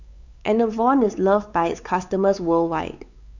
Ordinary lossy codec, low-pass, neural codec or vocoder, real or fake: none; 7.2 kHz; codec, 16 kHz, 4 kbps, X-Codec, WavLM features, trained on Multilingual LibriSpeech; fake